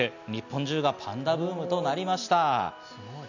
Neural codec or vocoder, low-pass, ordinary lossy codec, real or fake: none; 7.2 kHz; none; real